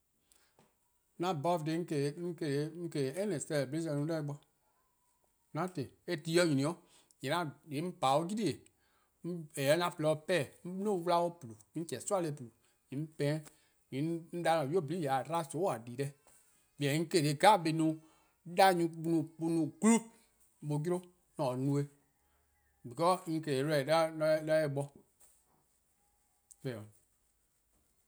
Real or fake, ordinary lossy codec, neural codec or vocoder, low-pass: real; none; none; none